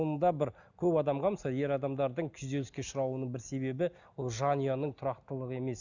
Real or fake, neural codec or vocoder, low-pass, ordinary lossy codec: real; none; 7.2 kHz; none